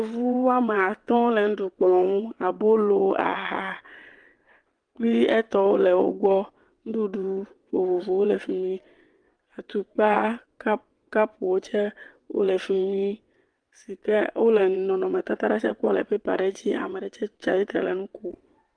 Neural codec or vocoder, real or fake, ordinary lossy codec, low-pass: vocoder, 22.05 kHz, 80 mel bands, WaveNeXt; fake; Opus, 24 kbps; 9.9 kHz